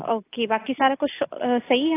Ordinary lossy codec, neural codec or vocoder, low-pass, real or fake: AAC, 16 kbps; none; 3.6 kHz; real